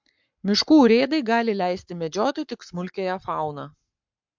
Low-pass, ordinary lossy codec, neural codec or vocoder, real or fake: 7.2 kHz; MP3, 64 kbps; codec, 44.1 kHz, 7.8 kbps, Pupu-Codec; fake